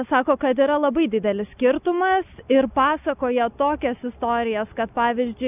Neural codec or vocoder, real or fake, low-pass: none; real; 3.6 kHz